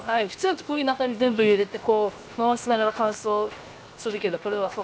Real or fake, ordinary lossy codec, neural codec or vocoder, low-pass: fake; none; codec, 16 kHz, 0.7 kbps, FocalCodec; none